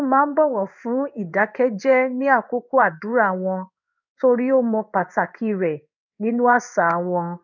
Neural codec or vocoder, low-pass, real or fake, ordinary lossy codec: codec, 16 kHz in and 24 kHz out, 1 kbps, XY-Tokenizer; 7.2 kHz; fake; none